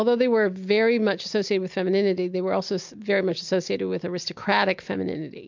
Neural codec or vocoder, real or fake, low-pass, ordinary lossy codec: none; real; 7.2 kHz; MP3, 64 kbps